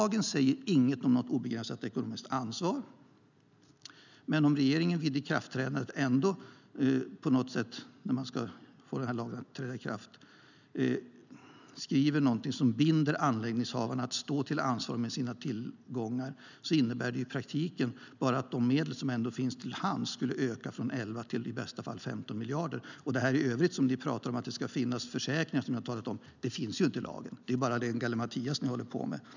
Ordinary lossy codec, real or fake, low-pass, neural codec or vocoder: none; real; 7.2 kHz; none